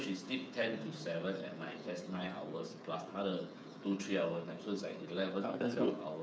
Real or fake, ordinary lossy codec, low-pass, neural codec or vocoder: fake; none; none; codec, 16 kHz, 8 kbps, FreqCodec, smaller model